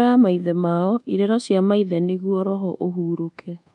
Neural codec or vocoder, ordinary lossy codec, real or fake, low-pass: codec, 24 kHz, 1.2 kbps, DualCodec; none; fake; 10.8 kHz